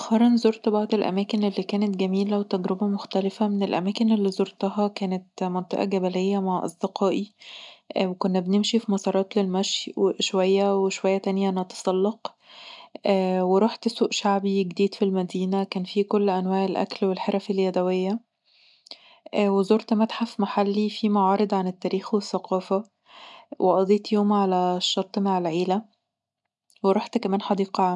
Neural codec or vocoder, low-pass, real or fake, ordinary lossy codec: none; 10.8 kHz; real; none